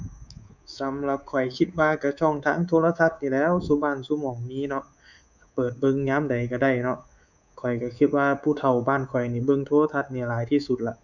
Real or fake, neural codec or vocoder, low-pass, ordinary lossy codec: fake; codec, 24 kHz, 3.1 kbps, DualCodec; 7.2 kHz; none